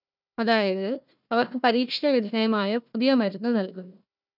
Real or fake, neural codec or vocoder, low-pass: fake; codec, 16 kHz, 1 kbps, FunCodec, trained on Chinese and English, 50 frames a second; 5.4 kHz